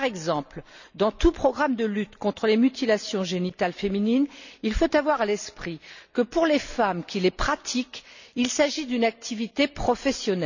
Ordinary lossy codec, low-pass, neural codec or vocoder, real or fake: none; 7.2 kHz; none; real